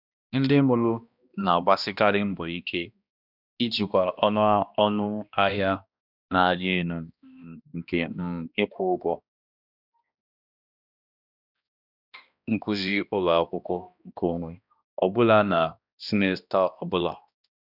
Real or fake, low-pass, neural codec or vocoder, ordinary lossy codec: fake; 5.4 kHz; codec, 16 kHz, 1 kbps, X-Codec, HuBERT features, trained on balanced general audio; none